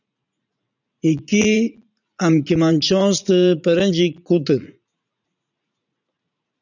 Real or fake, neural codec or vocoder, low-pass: fake; vocoder, 44.1 kHz, 80 mel bands, Vocos; 7.2 kHz